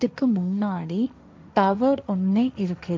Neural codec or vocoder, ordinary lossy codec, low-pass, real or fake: codec, 16 kHz, 1.1 kbps, Voila-Tokenizer; none; none; fake